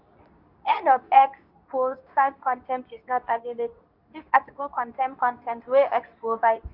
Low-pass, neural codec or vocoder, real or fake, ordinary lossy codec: 5.4 kHz; codec, 24 kHz, 0.9 kbps, WavTokenizer, medium speech release version 2; fake; none